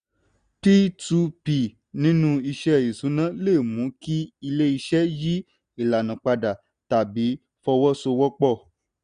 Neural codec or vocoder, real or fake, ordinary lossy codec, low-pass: none; real; none; 9.9 kHz